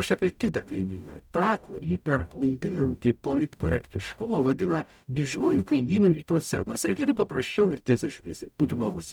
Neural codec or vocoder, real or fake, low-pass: codec, 44.1 kHz, 0.9 kbps, DAC; fake; 19.8 kHz